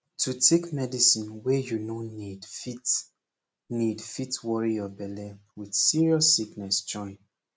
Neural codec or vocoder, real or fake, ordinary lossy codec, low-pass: none; real; none; none